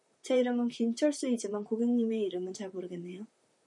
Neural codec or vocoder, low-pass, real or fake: vocoder, 44.1 kHz, 128 mel bands, Pupu-Vocoder; 10.8 kHz; fake